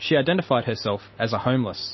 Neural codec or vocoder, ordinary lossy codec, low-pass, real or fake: none; MP3, 24 kbps; 7.2 kHz; real